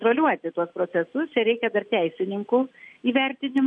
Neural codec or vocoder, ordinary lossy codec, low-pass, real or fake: none; MP3, 64 kbps; 9.9 kHz; real